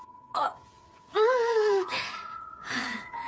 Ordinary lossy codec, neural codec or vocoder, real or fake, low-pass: none; codec, 16 kHz, 8 kbps, FreqCodec, smaller model; fake; none